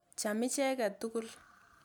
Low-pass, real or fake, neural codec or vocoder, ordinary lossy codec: none; real; none; none